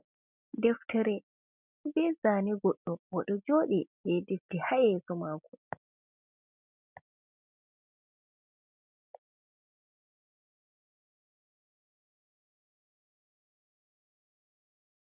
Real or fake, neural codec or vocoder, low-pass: real; none; 3.6 kHz